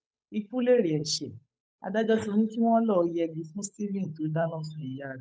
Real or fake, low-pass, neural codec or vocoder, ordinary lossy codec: fake; none; codec, 16 kHz, 8 kbps, FunCodec, trained on Chinese and English, 25 frames a second; none